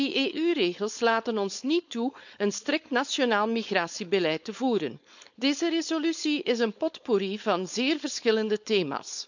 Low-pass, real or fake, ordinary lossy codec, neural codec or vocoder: 7.2 kHz; fake; none; codec, 16 kHz, 4.8 kbps, FACodec